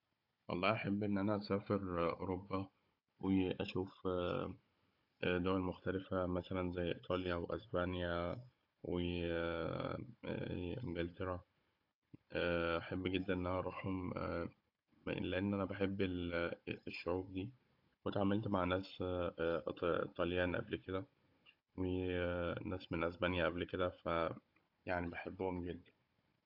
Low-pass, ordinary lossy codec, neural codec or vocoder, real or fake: 5.4 kHz; AAC, 48 kbps; codec, 16 kHz, 16 kbps, FunCodec, trained on Chinese and English, 50 frames a second; fake